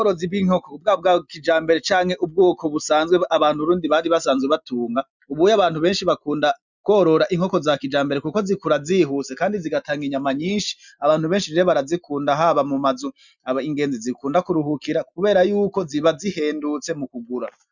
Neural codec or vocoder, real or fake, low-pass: none; real; 7.2 kHz